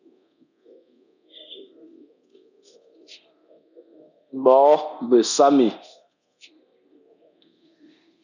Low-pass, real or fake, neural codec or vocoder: 7.2 kHz; fake; codec, 24 kHz, 0.9 kbps, DualCodec